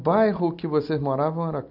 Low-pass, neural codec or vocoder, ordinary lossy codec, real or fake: 5.4 kHz; none; none; real